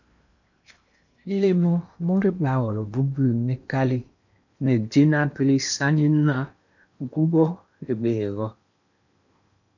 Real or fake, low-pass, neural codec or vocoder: fake; 7.2 kHz; codec, 16 kHz in and 24 kHz out, 0.8 kbps, FocalCodec, streaming, 65536 codes